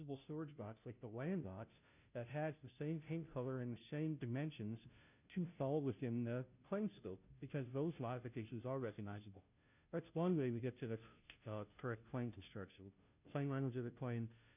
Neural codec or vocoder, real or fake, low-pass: codec, 16 kHz, 0.5 kbps, FunCodec, trained on Chinese and English, 25 frames a second; fake; 3.6 kHz